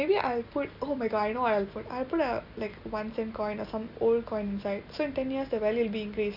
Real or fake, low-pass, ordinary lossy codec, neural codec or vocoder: real; 5.4 kHz; none; none